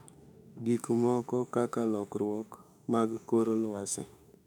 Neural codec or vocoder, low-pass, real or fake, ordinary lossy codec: autoencoder, 48 kHz, 32 numbers a frame, DAC-VAE, trained on Japanese speech; 19.8 kHz; fake; none